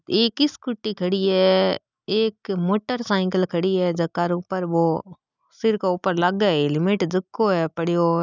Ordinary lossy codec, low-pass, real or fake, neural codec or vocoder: none; 7.2 kHz; real; none